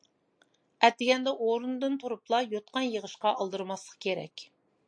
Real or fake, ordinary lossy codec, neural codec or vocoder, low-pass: real; MP3, 48 kbps; none; 9.9 kHz